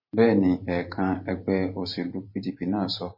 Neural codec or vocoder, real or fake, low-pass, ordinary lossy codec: vocoder, 44.1 kHz, 128 mel bands every 512 samples, BigVGAN v2; fake; 5.4 kHz; MP3, 24 kbps